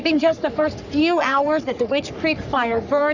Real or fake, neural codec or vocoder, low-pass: fake; codec, 44.1 kHz, 3.4 kbps, Pupu-Codec; 7.2 kHz